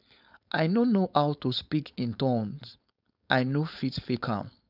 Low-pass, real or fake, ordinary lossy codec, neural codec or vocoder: 5.4 kHz; fake; none; codec, 16 kHz, 4.8 kbps, FACodec